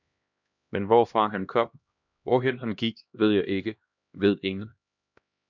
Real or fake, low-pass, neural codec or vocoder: fake; 7.2 kHz; codec, 16 kHz, 1 kbps, X-Codec, HuBERT features, trained on LibriSpeech